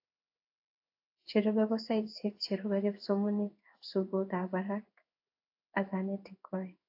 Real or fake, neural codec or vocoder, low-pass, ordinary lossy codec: fake; codec, 16 kHz in and 24 kHz out, 1 kbps, XY-Tokenizer; 5.4 kHz; AAC, 32 kbps